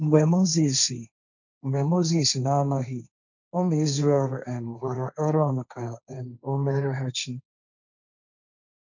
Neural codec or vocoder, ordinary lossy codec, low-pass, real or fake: codec, 16 kHz, 1.1 kbps, Voila-Tokenizer; none; 7.2 kHz; fake